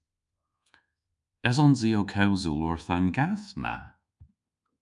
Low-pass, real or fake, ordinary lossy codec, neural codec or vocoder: 10.8 kHz; fake; MP3, 96 kbps; codec, 24 kHz, 1.2 kbps, DualCodec